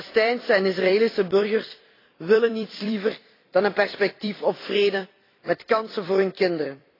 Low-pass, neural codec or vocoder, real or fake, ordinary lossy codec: 5.4 kHz; vocoder, 44.1 kHz, 128 mel bands every 256 samples, BigVGAN v2; fake; AAC, 24 kbps